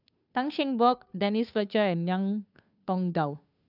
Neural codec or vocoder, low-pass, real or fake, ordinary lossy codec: codec, 16 kHz, 2 kbps, FunCodec, trained on Chinese and English, 25 frames a second; 5.4 kHz; fake; none